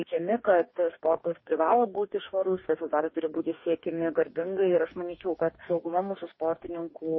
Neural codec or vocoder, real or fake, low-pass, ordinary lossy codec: codec, 44.1 kHz, 2.6 kbps, DAC; fake; 7.2 kHz; MP3, 24 kbps